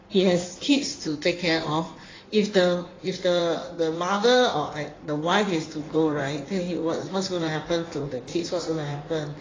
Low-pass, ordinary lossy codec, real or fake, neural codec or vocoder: 7.2 kHz; AAC, 32 kbps; fake; codec, 16 kHz in and 24 kHz out, 1.1 kbps, FireRedTTS-2 codec